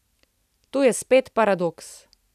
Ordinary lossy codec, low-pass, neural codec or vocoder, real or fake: none; 14.4 kHz; none; real